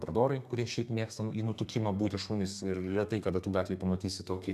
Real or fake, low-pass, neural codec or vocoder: fake; 14.4 kHz; codec, 32 kHz, 1.9 kbps, SNAC